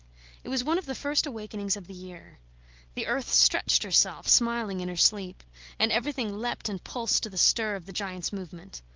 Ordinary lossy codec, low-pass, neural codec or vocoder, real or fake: Opus, 24 kbps; 7.2 kHz; none; real